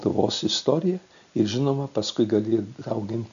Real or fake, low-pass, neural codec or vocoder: real; 7.2 kHz; none